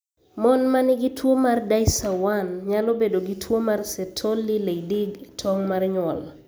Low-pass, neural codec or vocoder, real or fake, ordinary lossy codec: none; none; real; none